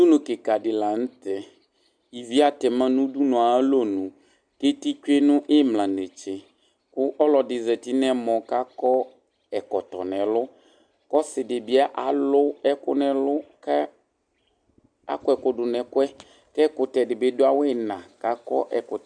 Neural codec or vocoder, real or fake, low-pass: none; real; 9.9 kHz